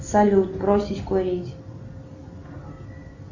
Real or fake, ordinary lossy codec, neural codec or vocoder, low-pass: real; Opus, 64 kbps; none; 7.2 kHz